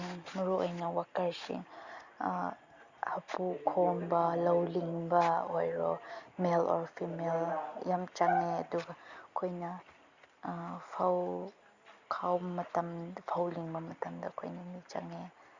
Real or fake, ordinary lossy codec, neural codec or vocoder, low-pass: real; Opus, 64 kbps; none; 7.2 kHz